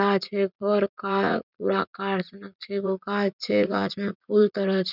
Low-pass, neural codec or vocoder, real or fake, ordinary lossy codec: 5.4 kHz; vocoder, 44.1 kHz, 80 mel bands, Vocos; fake; none